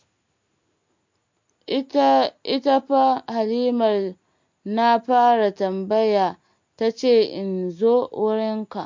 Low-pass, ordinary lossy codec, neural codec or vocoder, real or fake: 7.2 kHz; MP3, 48 kbps; none; real